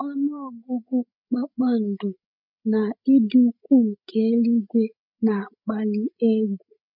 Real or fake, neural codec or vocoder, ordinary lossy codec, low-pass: fake; codec, 16 kHz, 8 kbps, FreqCodec, larger model; none; 5.4 kHz